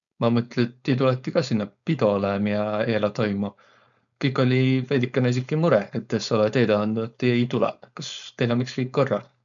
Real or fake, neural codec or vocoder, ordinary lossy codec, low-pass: fake; codec, 16 kHz, 4.8 kbps, FACodec; none; 7.2 kHz